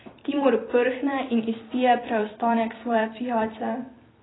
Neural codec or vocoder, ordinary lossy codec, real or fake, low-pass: vocoder, 44.1 kHz, 128 mel bands every 256 samples, BigVGAN v2; AAC, 16 kbps; fake; 7.2 kHz